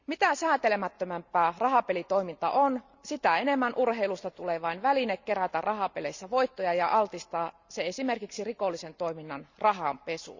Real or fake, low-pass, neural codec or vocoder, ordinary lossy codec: real; 7.2 kHz; none; Opus, 64 kbps